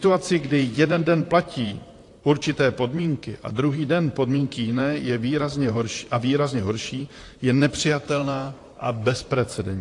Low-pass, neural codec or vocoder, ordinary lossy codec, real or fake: 10.8 kHz; vocoder, 44.1 kHz, 128 mel bands, Pupu-Vocoder; AAC, 48 kbps; fake